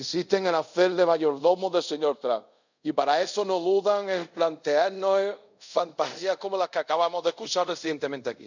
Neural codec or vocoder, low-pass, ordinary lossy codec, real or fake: codec, 24 kHz, 0.5 kbps, DualCodec; 7.2 kHz; none; fake